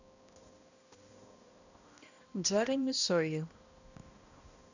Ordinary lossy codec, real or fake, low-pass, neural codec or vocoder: none; fake; 7.2 kHz; codec, 16 kHz, 1 kbps, X-Codec, HuBERT features, trained on balanced general audio